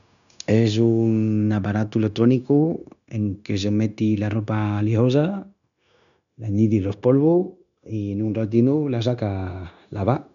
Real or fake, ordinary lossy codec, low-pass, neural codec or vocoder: fake; none; 7.2 kHz; codec, 16 kHz, 0.9 kbps, LongCat-Audio-Codec